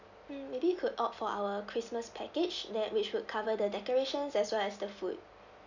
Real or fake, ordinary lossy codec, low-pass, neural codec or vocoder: real; none; 7.2 kHz; none